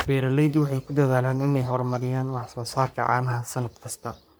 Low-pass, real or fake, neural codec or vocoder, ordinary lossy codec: none; fake; codec, 44.1 kHz, 3.4 kbps, Pupu-Codec; none